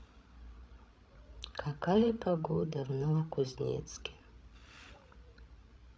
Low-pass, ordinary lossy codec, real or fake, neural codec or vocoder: none; none; fake; codec, 16 kHz, 16 kbps, FreqCodec, larger model